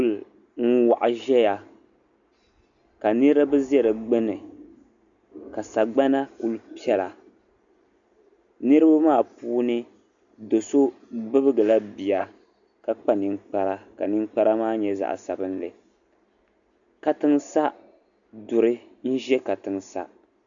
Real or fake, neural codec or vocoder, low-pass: real; none; 7.2 kHz